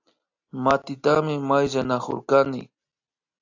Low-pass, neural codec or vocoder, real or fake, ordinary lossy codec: 7.2 kHz; none; real; AAC, 48 kbps